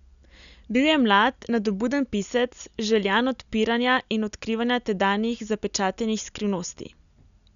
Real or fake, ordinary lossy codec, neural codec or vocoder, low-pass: real; none; none; 7.2 kHz